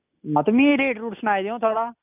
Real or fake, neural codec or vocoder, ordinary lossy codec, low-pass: real; none; none; 3.6 kHz